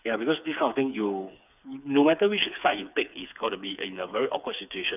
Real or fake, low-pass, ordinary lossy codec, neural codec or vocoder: fake; 3.6 kHz; none; codec, 16 kHz, 4 kbps, FreqCodec, smaller model